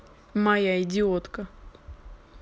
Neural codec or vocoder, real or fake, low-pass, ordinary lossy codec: none; real; none; none